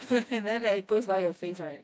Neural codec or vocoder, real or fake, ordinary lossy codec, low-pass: codec, 16 kHz, 1 kbps, FreqCodec, smaller model; fake; none; none